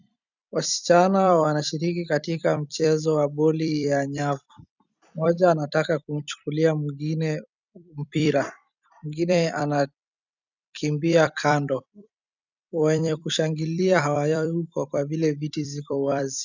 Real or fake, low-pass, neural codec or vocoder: fake; 7.2 kHz; vocoder, 44.1 kHz, 128 mel bands every 256 samples, BigVGAN v2